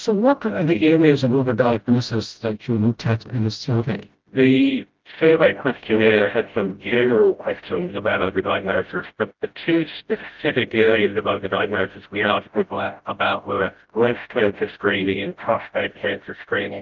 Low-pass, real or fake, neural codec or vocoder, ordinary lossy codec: 7.2 kHz; fake; codec, 16 kHz, 0.5 kbps, FreqCodec, smaller model; Opus, 32 kbps